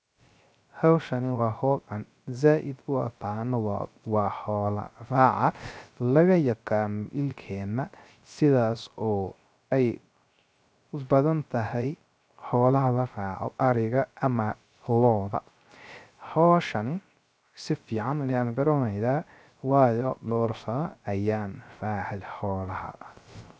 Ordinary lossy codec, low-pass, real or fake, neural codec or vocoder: none; none; fake; codec, 16 kHz, 0.3 kbps, FocalCodec